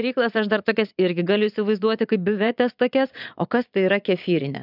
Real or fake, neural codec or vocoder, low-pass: fake; vocoder, 22.05 kHz, 80 mel bands, Vocos; 5.4 kHz